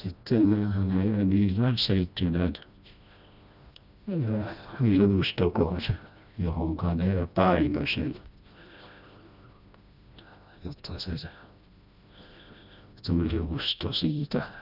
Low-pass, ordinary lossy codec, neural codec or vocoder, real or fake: 5.4 kHz; none; codec, 16 kHz, 1 kbps, FreqCodec, smaller model; fake